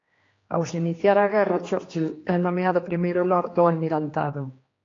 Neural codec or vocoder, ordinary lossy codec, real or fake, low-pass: codec, 16 kHz, 2 kbps, X-Codec, HuBERT features, trained on general audio; AAC, 32 kbps; fake; 7.2 kHz